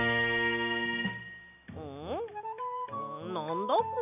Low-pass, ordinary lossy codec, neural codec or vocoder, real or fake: 3.6 kHz; none; none; real